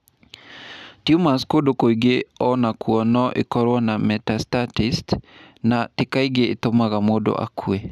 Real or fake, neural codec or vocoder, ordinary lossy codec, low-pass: real; none; none; 14.4 kHz